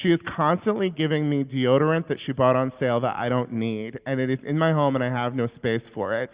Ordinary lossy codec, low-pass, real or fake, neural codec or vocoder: Opus, 64 kbps; 3.6 kHz; real; none